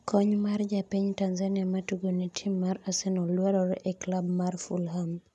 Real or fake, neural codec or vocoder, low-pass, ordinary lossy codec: real; none; none; none